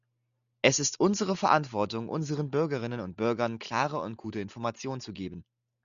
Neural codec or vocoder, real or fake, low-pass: none; real; 7.2 kHz